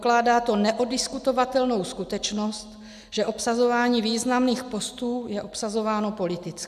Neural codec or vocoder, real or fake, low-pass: none; real; 14.4 kHz